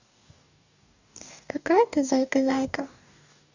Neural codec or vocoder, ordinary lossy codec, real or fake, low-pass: codec, 44.1 kHz, 2.6 kbps, DAC; none; fake; 7.2 kHz